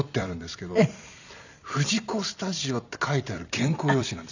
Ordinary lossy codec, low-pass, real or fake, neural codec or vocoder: none; 7.2 kHz; fake; vocoder, 44.1 kHz, 128 mel bands every 256 samples, BigVGAN v2